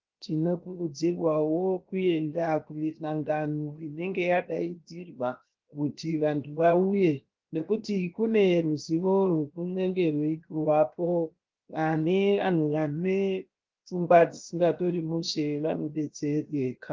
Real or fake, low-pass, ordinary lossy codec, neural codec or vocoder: fake; 7.2 kHz; Opus, 32 kbps; codec, 16 kHz, 0.7 kbps, FocalCodec